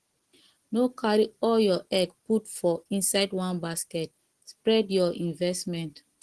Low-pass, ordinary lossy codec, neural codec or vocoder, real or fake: 10.8 kHz; Opus, 16 kbps; none; real